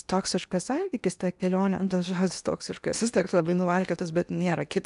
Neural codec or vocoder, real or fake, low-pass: codec, 16 kHz in and 24 kHz out, 0.8 kbps, FocalCodec, streaming, 65536 codes; fake; 10.8 kHz